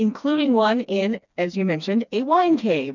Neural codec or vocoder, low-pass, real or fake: codec, 16 kHz, 2 kbps, FreqCodec, smaller model; 7.2 kHz; fake